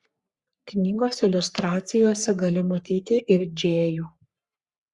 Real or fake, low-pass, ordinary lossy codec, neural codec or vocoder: fake; 10.8 kHz; Opus, 64 kbps; codec, 44.1 kHz, 3.4 kbps, Pupu-Codec